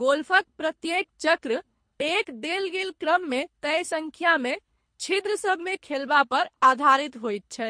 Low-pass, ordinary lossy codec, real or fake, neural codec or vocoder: 9.9 kHz; MP3, 48 kbps; fake; codec, 24 kHz, 3 kbps, HILCodec